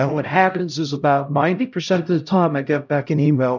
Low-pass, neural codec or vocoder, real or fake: 7.2 kHz; codec, 16 kHz, 0.5 kbps, X-Codec, HuBERT features, trained on LibriSpeech; fake